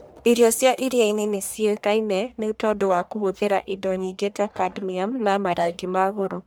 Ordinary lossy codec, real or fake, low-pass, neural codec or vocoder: none; fake; none; codec, 44.1 kHz, 1.7 kbps, Pupu-Codec